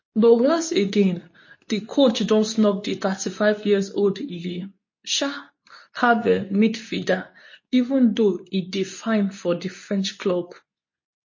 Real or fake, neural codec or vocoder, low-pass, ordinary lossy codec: fake; codec, 24 kHz, 0.9 kbps, WavTokenizer, medium speech release version 2; 7.2 kHz; MP3, 32 kbps